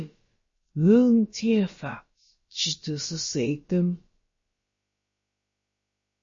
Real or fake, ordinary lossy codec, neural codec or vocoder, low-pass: fake; MP3, 32 kbps; codec, 16 kHz, about 1 kbps, DyCAST, with the encoder's durations; 7.2 kHz